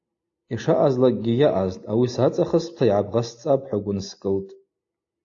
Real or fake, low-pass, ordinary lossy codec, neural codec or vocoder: real; 7.2 kHz; AAC, 48 kbps; none